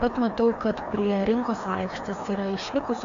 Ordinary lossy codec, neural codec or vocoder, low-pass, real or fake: AAC, 64 kbps; codec, 16 kHz, 2 kbps, FreqCodec, larger model; 7.2 kHz; fake